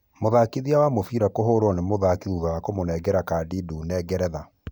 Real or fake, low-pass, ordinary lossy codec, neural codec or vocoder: real; none; none; none